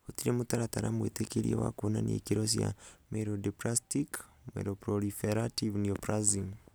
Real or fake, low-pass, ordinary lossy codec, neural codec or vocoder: real; none; none; none